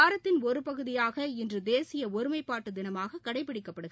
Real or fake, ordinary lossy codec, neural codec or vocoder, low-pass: real; none; none; none